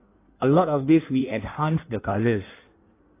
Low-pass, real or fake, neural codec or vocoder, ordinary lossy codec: 3.6 kHz; fake; codec, 16 kHz in and 24 kHz out, 1.1 kbps, FireRedTTS-2 codec; AAC, 24 kbps